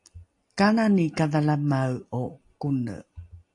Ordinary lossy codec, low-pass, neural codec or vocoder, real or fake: AAC, 48 kbps; 10.8 kHz; none; real